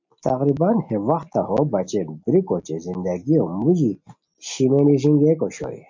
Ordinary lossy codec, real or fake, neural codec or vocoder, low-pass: MP3, 64 kbps; real; none; 7.2 kHz